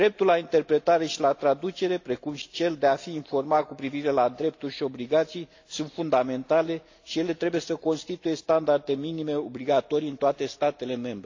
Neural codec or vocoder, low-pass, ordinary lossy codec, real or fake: none; 7.2 kHz; AAC, 48 kbps; real